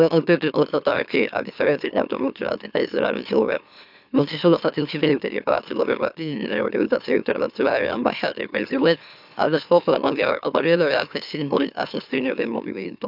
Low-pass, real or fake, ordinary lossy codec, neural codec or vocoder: 5.4 kHz; fake; none; autoencoder, 44.1 kHz, a latent of 192 numbers a frame, MeloTTS